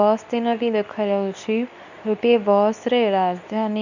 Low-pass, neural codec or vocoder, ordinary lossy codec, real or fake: 7.2 kHz; codec, 24 kHz, 0.9 kbps, WavTokenizer, medium speech release version 2; none; fake